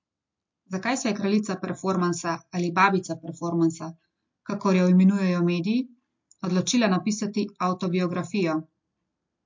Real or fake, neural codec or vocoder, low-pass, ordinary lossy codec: real; none; 7.2 kHz; MP3, 48 kbps